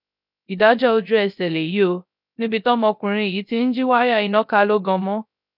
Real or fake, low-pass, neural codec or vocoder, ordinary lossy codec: fake; 5.4 kHz; codec, 16 kHz, 0.3 kbps, FocalCodec; none